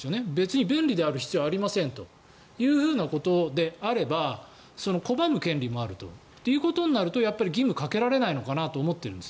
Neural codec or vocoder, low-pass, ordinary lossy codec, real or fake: none; none; none; real